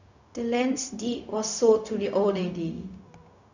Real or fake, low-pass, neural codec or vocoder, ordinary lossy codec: fake; 7.2 kHz; codec, 16 kHz, 0.4 kbps, LongCat-Audio-Codec; none